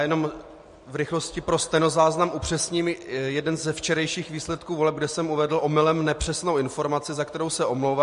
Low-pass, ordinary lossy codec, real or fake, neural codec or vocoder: 14.4 kHz; MP3, 48 kbps; real; none